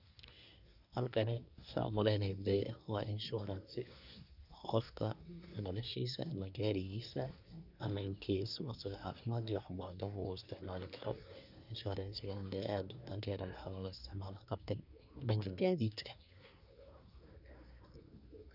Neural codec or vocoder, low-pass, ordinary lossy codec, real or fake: codec, 24 kHz, 1 kbps, SNAC; 5.4 kHz; none; fake